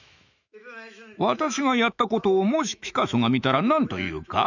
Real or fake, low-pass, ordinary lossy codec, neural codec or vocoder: fake; 7.2 kHz; none; autoencoder, 48 kHz, 128 numbers a frame, DAC-VAE, trained on Japanese speech